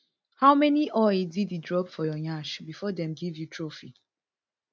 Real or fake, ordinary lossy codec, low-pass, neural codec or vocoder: real; none; none; none